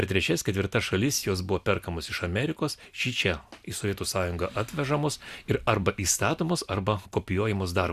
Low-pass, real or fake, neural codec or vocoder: 14.4 kHz; real; none